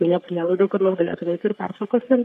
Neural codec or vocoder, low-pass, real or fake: codec, 44.1 kHz, 3.4 kbps, Pupu-Codec; 14.4 kHz; fake